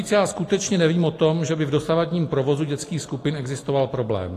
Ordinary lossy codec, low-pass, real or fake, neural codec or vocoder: AAC, 48 kbps; 14.4 kHz; real; none